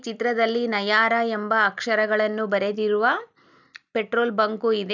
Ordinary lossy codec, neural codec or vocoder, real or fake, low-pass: none; none; real; 7.2 kHz